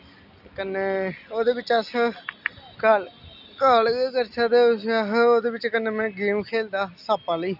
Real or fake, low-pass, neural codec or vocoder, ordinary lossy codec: real; 5.4 kHz; none; none